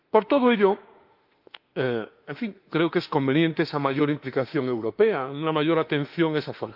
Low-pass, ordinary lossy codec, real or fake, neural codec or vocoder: 5.4 kHz; Opus, 32 kbps; fake; autoencoder, 48 kHz, 32 numbers a frame, DAC-VAE, trained on Japanese speech